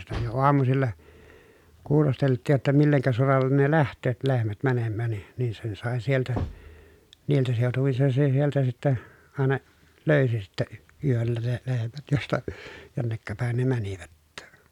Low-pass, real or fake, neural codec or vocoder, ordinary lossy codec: 19.8 kHz; real; none; none